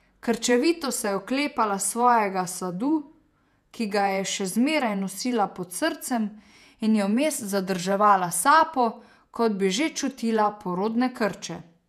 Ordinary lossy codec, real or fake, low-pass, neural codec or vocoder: none; fake; 14.4 kHz; vocoder, 48 kHz, 128 mel bands, Vocos